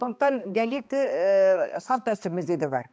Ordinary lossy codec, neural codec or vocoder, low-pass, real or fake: none; codec, 16 kHz, 2 kbps, X-Codec, HuBERT features, trained on balanced general audio; none; fake